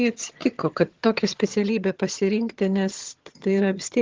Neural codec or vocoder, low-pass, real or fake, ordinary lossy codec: vocoder, 22.05 kHz, 80 mel bands, HiFi-GAN; 7.2 kHz; fake; Opus, 16 kbps